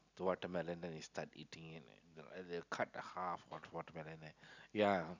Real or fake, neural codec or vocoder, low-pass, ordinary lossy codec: real; none; 7.2 kHz; none